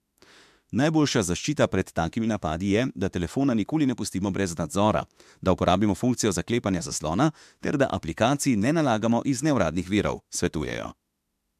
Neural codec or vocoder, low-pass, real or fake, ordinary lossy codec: autoencoder, 48 kHz, 32 numbers a frame, DAC-VAE, trained on Japanese speech; 14.4 kHz; fake; MP3, 96 kbps